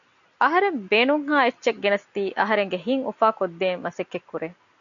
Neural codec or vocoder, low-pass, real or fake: none; 7.2 kHz; real